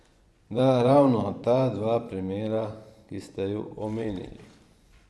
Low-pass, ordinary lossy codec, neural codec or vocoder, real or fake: none; none; vocoder, 24 kHz, 100 mel bands, Vocos; fake